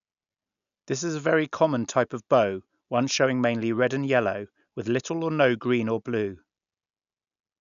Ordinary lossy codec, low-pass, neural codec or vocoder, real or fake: none; 7.2 kHz; none; real